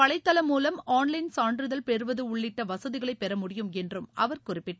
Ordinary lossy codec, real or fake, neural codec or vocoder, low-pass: none; real; none; none